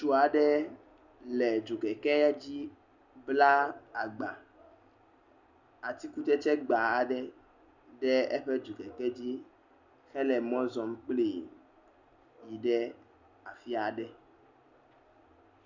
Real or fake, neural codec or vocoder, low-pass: real; none; 7.2 kHz